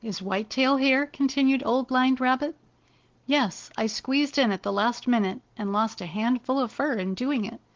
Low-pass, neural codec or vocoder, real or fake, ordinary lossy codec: 7.2 kHz; none; real; Opus, 16 kbps